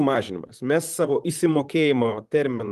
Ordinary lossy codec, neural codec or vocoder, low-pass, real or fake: Opus, 32 kbps; vocoder, 44.1 kHz, 128 mel bands, Pupu-Vocoder; 14.4 kHz; fake